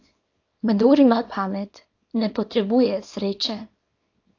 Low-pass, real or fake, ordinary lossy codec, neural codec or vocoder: 7.2 kHz; fake; AAC, 48 kbps; codec, 24 kHz, 0.9 kbps, WavTokenizer, small release